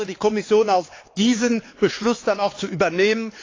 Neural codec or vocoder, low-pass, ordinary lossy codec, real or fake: codec, 16 kHz, 4 kbps, X-Codec, HuBERT features, trained on LibriSpeech; 7.2 kHz; AAC, 32 kbps; fake